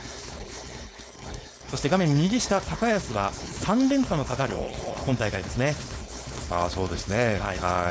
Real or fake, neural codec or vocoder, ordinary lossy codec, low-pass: fake; codec, 16 kHz, 4.8 kbps, FACodec; none; none